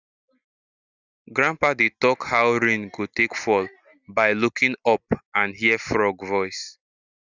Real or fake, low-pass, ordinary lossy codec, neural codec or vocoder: real; 7.2 kHz; Opus, 64 kbps; none